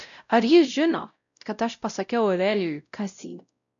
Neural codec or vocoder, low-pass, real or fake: codec, 16 kHz, 0.5 kbps, X-Codec, WavLM features, trained on Multilingual LibriSpeech; 7.2 kHz; fake